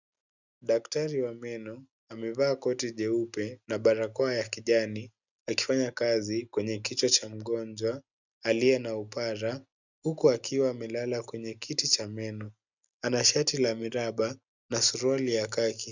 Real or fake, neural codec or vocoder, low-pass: real; none; 7.2 kHz